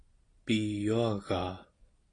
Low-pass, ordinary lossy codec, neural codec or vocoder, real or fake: 9.9 kHz; AAC, 32 kbps; none; real